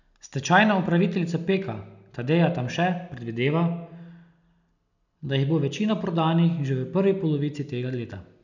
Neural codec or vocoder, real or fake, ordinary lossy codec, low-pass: none; real; none; 7.2 kHz